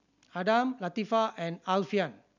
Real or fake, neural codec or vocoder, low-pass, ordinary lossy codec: real; none; 7.2 kHz; none